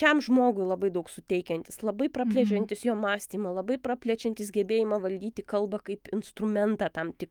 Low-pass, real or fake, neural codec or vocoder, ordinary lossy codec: 19.8 kHz; fake; autoencoder, 48 kHz, 128 numbers a frame, DAC-VAE, trained on Japanese speech; Opus, 32 kbps